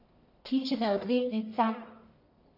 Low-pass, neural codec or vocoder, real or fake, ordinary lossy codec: 5.4 kHz; codec, 44.1 kHz, 1.7 kbps, Pupu-Codec; fake; none